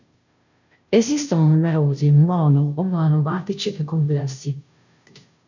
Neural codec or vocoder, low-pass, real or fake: codec, 16 kHz, 0.5 kbps, FunCodec, trained on Chinese and English, 25 frames a second; 7.2 kHz; fake